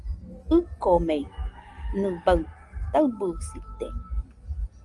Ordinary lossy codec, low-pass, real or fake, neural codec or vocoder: Opus, 32 kbps; 10.8 kHz; real; none